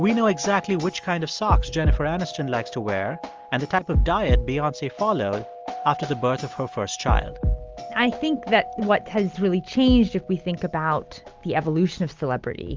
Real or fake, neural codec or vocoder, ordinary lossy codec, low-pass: real; none; Opus, 32 kbps; 7.2 kHz